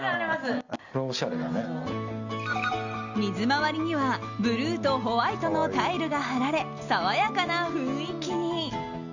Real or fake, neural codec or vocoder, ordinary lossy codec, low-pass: real; none; Opus, 64 kbps; 7.2 kHz